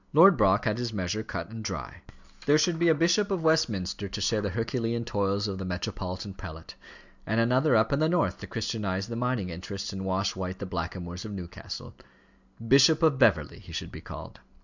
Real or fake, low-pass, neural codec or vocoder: real; 7.2 kHz; none